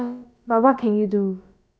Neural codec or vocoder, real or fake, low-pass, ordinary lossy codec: codec, 16 kHz, about 1 kbps, DyCAST, with the encoder's durations; fake; none; none